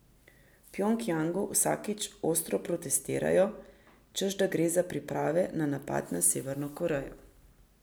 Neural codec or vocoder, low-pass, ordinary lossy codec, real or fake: none; none; none; real